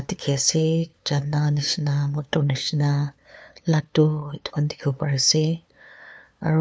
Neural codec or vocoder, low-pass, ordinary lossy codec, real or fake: codec, 16 kHz, 2 kbps, FunCodec, trained on LibriTTS, 25 frames a second; none; none; fake